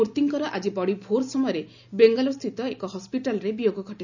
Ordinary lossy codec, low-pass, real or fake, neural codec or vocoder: none; 7.2 kHz; real; none